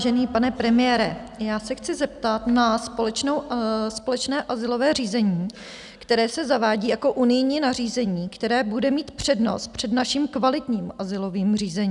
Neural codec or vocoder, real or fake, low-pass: none; real; 10.8 kHz